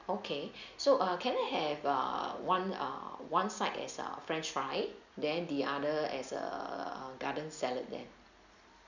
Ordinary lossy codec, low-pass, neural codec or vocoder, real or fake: none; 7.2 kHz; none; real